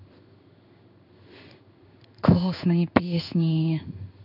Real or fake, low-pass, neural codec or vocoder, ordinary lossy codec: fake; 5.4 kHz; codec, 16 kHz in and 24 kHz out, 1 kbps, XY-Tokenizer; none